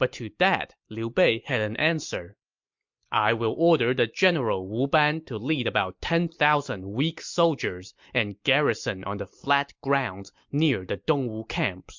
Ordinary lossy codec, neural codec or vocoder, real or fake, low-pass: MP3, 64 kbps; none; real; 7.2 kHz